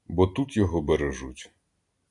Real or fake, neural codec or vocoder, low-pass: real; none; 10.8 kHz